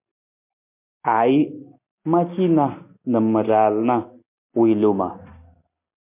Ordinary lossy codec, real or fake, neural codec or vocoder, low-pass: MP3, 24 kbps; real; none; 3.6 kHz